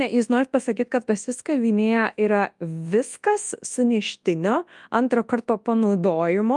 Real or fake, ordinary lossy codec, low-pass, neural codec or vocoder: fake; Opus, 32 kbps; 10.8 kHz; codec, 24 kHz, 0.9 kbps, WavTokenizer, large speech release